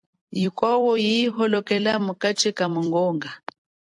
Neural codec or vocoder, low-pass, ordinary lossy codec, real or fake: vocoder, 44.1 kHz, 128 mel bands every 256 samples, BigVGAN v2; 10.8 kHz; AAC, 64 kbps; fake